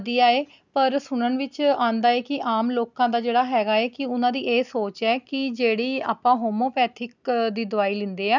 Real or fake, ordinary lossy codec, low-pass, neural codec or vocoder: real; none; 7.2 kHz; none